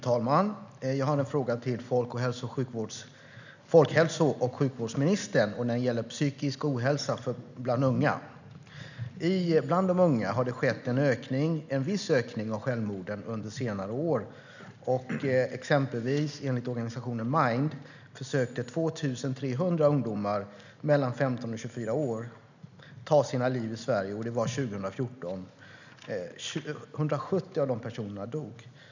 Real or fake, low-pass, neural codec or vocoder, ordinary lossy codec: real; 7.2 kHz; none; none